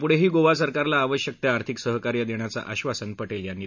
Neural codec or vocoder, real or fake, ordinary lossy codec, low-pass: none; real; none; 7.2 kHz